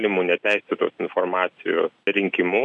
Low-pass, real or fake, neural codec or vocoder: 10.8 kHz; real; none